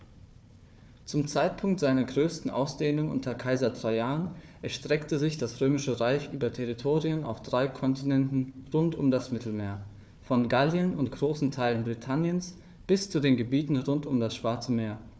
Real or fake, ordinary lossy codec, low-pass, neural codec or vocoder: fake; none; none; codec, 16 kHz, 4 kbps, FunCodec, trained on Chinese and English, 50 frames a second